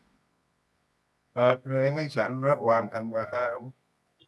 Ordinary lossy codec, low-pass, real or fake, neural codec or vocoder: none; none; fake; codec, 24 kHz, 0.9 kbps, WavTokenizer, medium music audio release